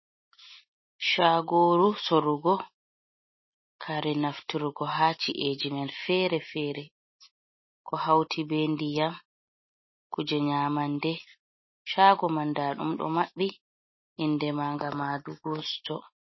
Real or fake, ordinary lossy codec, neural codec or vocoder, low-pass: real; MP3, 24 kbps; none; 7.2 kHz